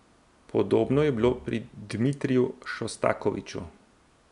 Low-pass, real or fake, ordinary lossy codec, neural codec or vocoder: 10.8 kHz; real; none; none